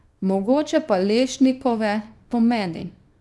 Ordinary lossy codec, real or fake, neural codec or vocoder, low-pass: none; fake; codec, 24 kHz, 0.9 kbps, WavTokenizer, small release; none